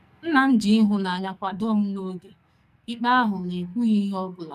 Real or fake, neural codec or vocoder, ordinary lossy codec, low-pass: fake; codec, 44.1 kHz, 2.6 kbps, SNAC; none; 14.4 kHz